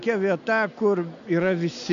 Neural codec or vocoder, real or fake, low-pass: none; real; 7.2 kHz